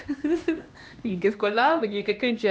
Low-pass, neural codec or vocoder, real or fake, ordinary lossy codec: none; codec, 16 kHz, 1 kbps, X-Codec, HuBERT features, trained on LibriSpeech; fake; none